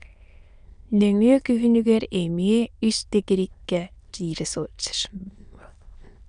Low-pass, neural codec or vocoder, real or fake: 9.9 kHz; autoencoder, 22.05 kHz, a latent of 192 numbers a frame, VITS, trained on many speakers; fake